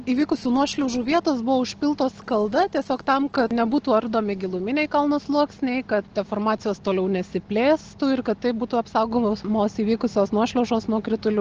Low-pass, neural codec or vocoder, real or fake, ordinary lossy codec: 7.2 kHz; none; real; Opus, 16 kbps